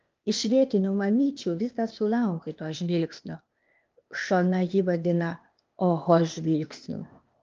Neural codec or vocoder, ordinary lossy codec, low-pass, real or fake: codec, 16 kHz, 0.8 kbps, ZipCodec; Opus, 24 kbps; 7.2 kHz; fake